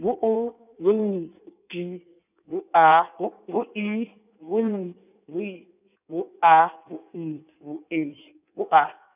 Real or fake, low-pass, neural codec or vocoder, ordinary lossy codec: fake; 3.6 kHz; codec, 16 kHz in and 24 kHz out, 1.1 kbps, FireRedTTS-2 codec; none